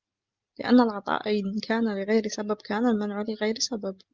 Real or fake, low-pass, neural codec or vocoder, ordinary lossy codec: real; 7.2 kHz; none; Opus, 32 kbps